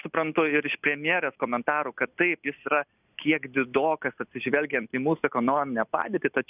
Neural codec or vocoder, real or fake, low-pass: none; real; 3.6 kHz